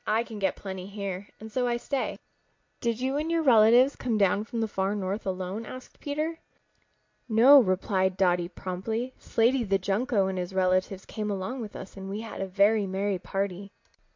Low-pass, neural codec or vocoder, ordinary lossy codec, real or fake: 7.2 kHz; none; MP3, 48 kbps; real